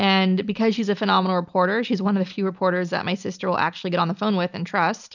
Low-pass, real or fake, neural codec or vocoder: 7.2 kHz; real; none